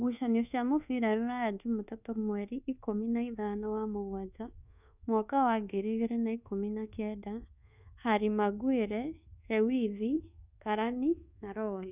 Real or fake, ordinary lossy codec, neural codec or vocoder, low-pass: fake; none; codec, 24 kHz, 1.2 kbps, DualCodec; 3.6 kHz